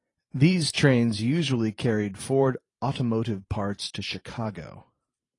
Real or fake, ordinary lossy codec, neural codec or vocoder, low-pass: fake; AAC, 32 kbps; vocoder, 44.1 kHz, 128 mel bands every 512 samples, BigVGAN v2; 10.8 kHz